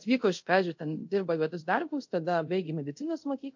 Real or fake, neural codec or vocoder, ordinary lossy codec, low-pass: fake; codec, 24 kHz, 0.5 kbps, DualCodec; MP3, 48 kbps; 7.2 kHz